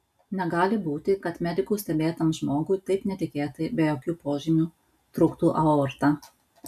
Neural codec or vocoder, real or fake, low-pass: none; real; 14.4 kHz